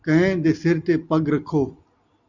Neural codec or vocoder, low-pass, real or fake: none; 7.2 kHz; real